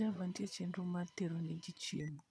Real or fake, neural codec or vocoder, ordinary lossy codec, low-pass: fake; vocoder, 22.05 kHz, 80 mel bands, Vocos; none; none